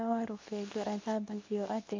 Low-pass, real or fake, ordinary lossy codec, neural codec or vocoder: 7.2 kHz; fake; MP3, 64 kbps; codec, 24 kHz, 0.9 kbps, WavTokenizer, medium speech release version 2